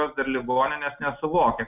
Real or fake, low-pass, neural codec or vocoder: real; 3.6 kHz; none